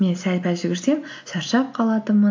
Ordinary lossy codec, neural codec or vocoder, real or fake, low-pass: AAC, 48 kbps; none; real; 7.2 kHz